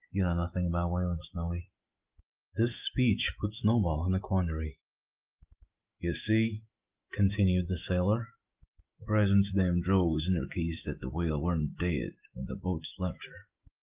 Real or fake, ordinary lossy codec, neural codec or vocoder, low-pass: fake; Opus, 32 kbps; autoencoder, 48 kHz, 128 numbers a frame, DAC-VAE, trained on Japanese speech; 3.6 kHz